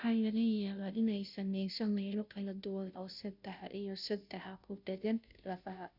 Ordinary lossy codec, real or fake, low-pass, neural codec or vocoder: none; fake; 5.4 kHz; codec, 16 kHz, 0.5 kbps, FunCodec, trained on Chinese and English, 25 frames a second